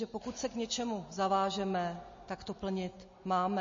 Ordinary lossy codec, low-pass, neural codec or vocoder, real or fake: MP3, 32 kbps; 7.2 kHz; none; real